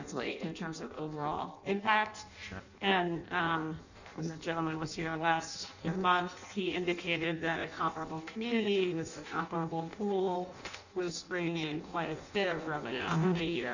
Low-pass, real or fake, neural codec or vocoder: 7.2 kHz; fake; codec, 16 kHz in and 24 kHz out, 0.6 kbps, FireRedTTS-2 codec